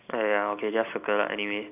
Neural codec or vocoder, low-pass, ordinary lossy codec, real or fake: none; 3.6 kHz; none; real